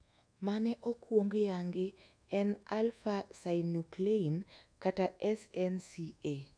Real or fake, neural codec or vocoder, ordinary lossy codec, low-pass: fake; codec, 24 kHz, 1.2 kbps, DualCodec; none; 9.9 kHz